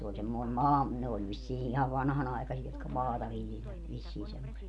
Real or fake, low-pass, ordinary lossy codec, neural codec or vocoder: real; none; none; none